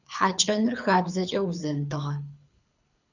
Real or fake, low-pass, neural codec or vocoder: fake; 7.2 kHz; codec, 24 kHz, 6 kbps, HILCodec